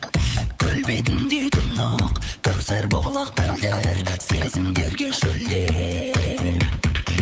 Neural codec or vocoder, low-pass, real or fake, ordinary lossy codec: codec, 16 kHz, 8 kbps, FunCodec, trained on LibriTTS, 25 frames a second; none; fake; none